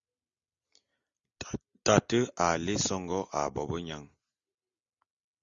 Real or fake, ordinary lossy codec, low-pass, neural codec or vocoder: real; Opus, 64 kbps; 7.2 kHz; none